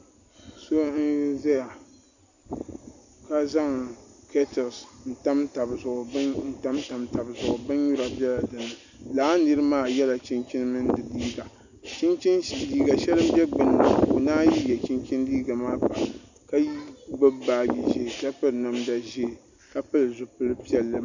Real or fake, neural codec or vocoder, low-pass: real; none; 7.2 kHz